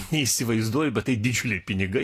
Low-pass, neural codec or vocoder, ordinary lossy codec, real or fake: 14.4 kHz; none; AAC, 48 kbps; real